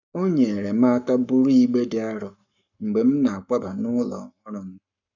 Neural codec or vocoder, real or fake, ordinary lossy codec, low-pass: codec, 44.1 kHz, 7.8 kbps, Pupu-Codec; fake; none; 7.2 kHz